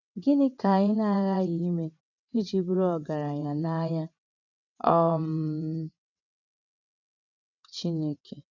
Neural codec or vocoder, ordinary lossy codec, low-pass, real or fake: vocoder, 22.05 kHz, 80 mel bands, WaveNeXt; none; 7.2 kHz; fake